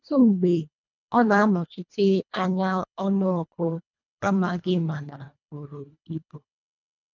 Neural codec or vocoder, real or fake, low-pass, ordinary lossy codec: codec, 24 kHz, 1.5 kbps, HILCodec; fake; 7.2 kHz; none